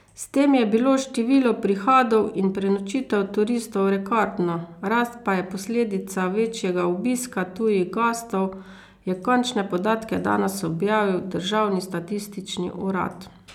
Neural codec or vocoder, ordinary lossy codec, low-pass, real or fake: none; none; 19.8 kHz; real